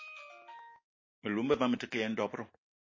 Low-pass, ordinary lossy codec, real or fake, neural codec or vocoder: 7.2 kHz; MP3, 32 kbps; real; none